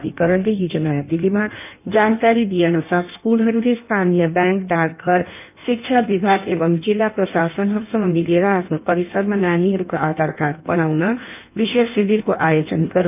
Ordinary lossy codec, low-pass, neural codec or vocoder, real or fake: none; 3.6 kHz; codec, 16 kHz in and 24 kHz out, 1.1 kbps, FireRedTTS-2 codec; fake